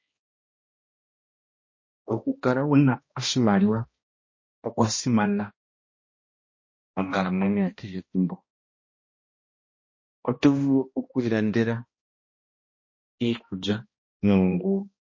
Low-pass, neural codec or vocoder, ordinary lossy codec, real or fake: 7.2 kHz; codec, 16 kHz, 1 kbps, X-Codec, HuBERT features, trained on balanced general audio; MP3, 32 kbps; fake